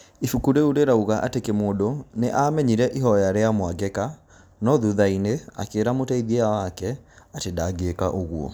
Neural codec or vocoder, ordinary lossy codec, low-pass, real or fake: none; none; none; real